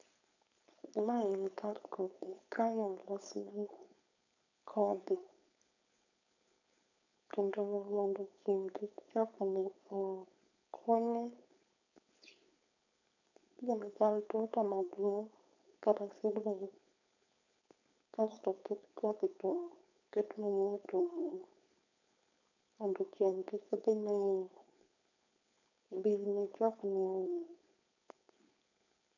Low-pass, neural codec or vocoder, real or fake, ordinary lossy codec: 7.2 kHz; codec, 16 kHz, 4.8 kbps, FACodec; fake; none